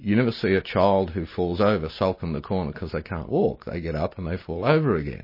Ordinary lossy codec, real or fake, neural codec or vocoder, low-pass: MP3, 24 kbps; real; none; 5.4 kHz